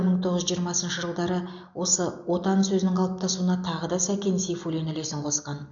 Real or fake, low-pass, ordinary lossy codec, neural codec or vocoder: real; 7.2 kHz; none; none